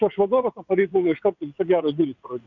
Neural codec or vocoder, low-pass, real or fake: vocoder, 22.05 kHz, 80 mel bands, WaveNeXt; 7.2 kHz; fake